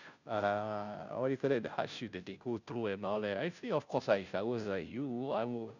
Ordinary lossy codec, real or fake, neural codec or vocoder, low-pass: none; fake; codec, 16 kHz, 0.5 kbps, FunCodec, trained on Chinese and English, 25 frames a second; 7.2 kHz